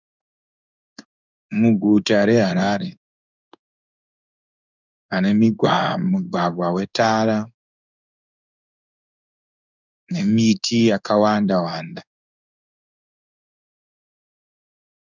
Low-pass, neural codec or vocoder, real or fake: 7.2 kHz; codec, 16 kHz in and 24 kHz out, 1 kbps, XY-Tokenizer; fake